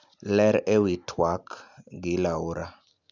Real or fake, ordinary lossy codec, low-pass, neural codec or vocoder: real; none; 7.2 kHz; none